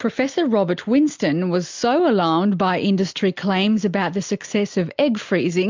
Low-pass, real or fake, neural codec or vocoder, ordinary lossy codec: 7.2 kHz; real; none; MP3, 64 kbps